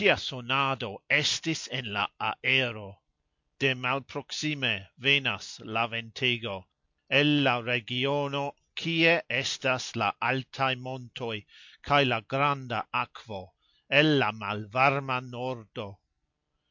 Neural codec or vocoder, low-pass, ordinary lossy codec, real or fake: none; 7.2 kHz; MP3, 48 kbps; real